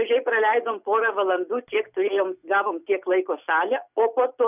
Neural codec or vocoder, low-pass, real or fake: none; 3.6 kHz; real